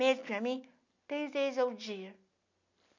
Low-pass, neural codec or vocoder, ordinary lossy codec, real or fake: 7.2 kHz; none; none; real